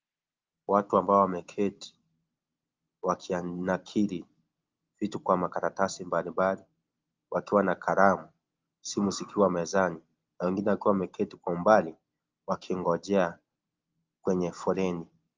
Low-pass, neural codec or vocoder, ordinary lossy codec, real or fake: 7.2 kHz; none; Opus, 32 kbps; real